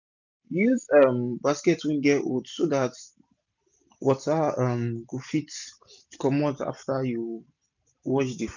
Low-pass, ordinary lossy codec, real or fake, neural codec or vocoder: 7.2 kHz; none; real; none